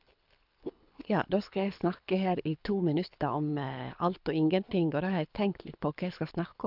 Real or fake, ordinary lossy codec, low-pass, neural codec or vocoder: fake; none; 5.4 kHz; codec, 24 kHz, 6 kbps, HILCodec